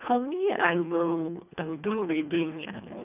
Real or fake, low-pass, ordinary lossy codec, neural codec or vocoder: fake; 3.6 kHz; none; codec, 24 kHz, 1.5 kbps, HILCodec